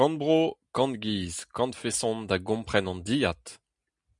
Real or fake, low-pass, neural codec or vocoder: real; 10.8 kHz; none